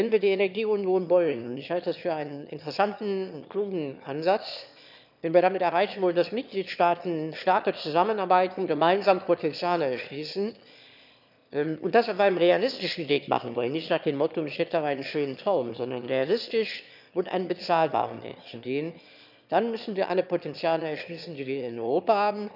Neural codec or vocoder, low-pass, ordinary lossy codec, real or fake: autoencoder, 22.05 kHz, a latent of 192 numbers a frame, VITS, trained on one speaker; 5.4 kHz; none; fake